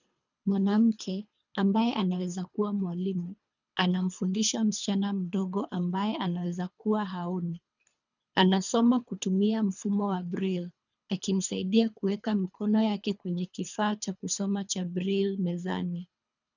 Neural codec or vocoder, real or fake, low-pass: codec, 24 kHz, 3 kbps, HILCodec; fake; 7.2 kHz